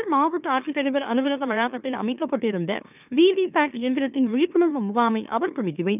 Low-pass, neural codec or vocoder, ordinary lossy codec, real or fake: 3.6 kHz; autoencoder, 44.1 kHz, a latent of 192 numbers a frame, MeloTTS; none; fake